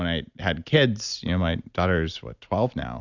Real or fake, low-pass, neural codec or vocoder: real; 7.2 kHz; none